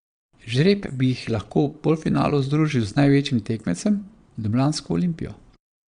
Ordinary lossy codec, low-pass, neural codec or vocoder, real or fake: Opus, 64 kbps; 9.9 kHz; vocoder, 22.05 kHz, 80 mel bands, Vocos; fake